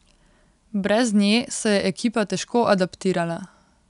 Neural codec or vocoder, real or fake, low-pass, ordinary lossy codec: none; real; 10.8 kHz; none